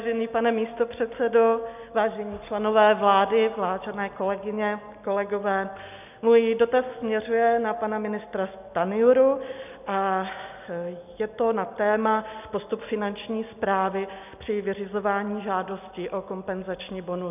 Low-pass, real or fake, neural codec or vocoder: 3.6 kHz; real; none